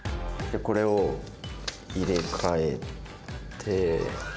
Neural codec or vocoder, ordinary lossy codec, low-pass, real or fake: none; none; none; real